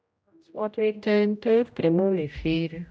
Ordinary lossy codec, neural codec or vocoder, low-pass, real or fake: none; codec, 16 kHz, 0.5 kbps, X-Codec, HuBERT features, trained on general audio; none; fake